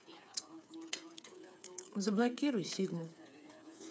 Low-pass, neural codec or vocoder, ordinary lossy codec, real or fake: none; codec, 16 kHz, 8 kbps, FreqCodec, smaller model; none; fake